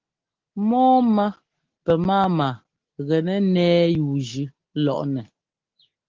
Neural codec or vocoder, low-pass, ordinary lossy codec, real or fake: none; 7.2 kHz; Opus, 16 kbps; real